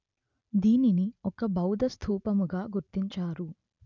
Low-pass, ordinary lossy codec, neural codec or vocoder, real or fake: 7.2 kHz; none; none; real